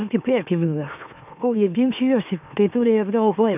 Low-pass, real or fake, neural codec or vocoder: 3.6 kHz; fake; autoencoder, 44.1 kHz, a latent of 192 numbers a frame, MeloTTS